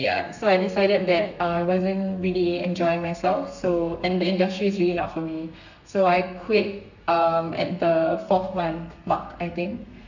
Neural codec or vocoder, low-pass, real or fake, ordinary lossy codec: codec, 32 kHz, 1.9 kbps, SNAC; 7.2 kHz; fake; none